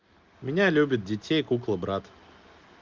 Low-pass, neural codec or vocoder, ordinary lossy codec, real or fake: 7.2 kHz; none; Opus, 32 kbps; real